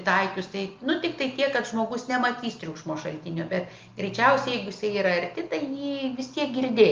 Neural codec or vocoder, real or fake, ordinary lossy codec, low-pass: none; real; Opus, 24 kbps; 7.2 kHz